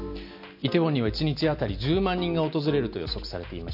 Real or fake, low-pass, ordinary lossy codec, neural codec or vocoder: real; 5.4 kHz; none; none